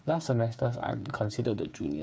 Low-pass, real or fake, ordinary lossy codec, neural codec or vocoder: none; fake; none; codec, 16 kHz, 8 kbps, FreqCodec, smaller model